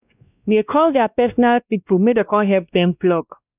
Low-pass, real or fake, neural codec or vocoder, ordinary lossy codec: 3.6 kHz; fake; codec, 16 kHz, 1 kbps, X-Codec, WavLM features, trained on Multilingual LibriSpeech; none